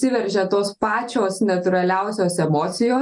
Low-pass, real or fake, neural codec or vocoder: 10.8 kHz; real; none